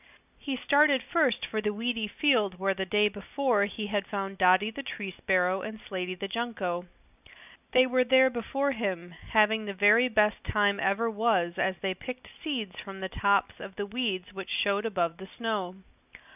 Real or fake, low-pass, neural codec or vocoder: real; 3.6 kHz; none